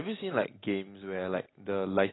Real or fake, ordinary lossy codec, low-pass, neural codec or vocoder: real; AAC, 16 kbps; 7.2 kHz; none